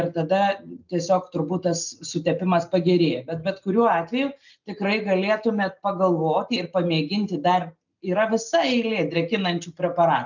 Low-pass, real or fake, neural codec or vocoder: 7.2 kHz; real; none